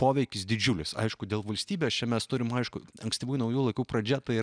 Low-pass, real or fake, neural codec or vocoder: 9.9 kHz; real; none